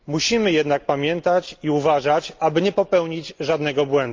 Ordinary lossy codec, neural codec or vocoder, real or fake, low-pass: Opus, 32 kbps; none; real; 7.2 kHz